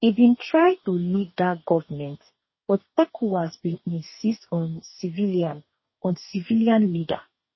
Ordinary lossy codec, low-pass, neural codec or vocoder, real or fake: MP3, 24 kbps; 7.2 kHz; codec, 44.1 kHz, 2.6 kbps, DAC; fake